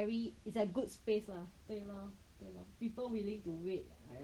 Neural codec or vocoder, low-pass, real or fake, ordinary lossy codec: none; 19.8 kHz; real; Opus, 16 kbps